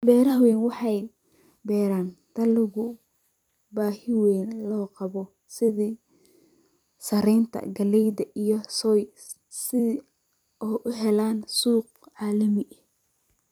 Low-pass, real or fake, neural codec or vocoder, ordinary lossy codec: 19.8 kHz; fake; vocoder, 44.1 kHz, 128 mel bands every 256 samples, BigVGAN v2; none